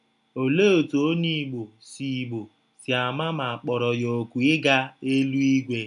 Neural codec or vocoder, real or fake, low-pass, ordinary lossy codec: none; real; 10.8 kHz; none